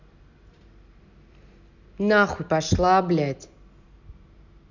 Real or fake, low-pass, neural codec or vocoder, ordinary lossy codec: real; 7.2 kHz; none; none